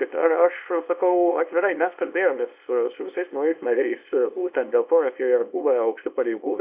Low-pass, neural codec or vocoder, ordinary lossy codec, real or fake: 3.6 kHz; codec, 24 kHz, 0.9 kbps, WavTokenizer, small release; Opus, 64 kbps; fake